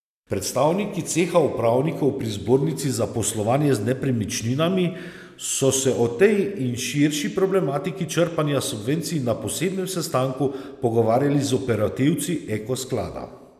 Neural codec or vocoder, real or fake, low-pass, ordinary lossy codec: none; real; 14.4 kHz; none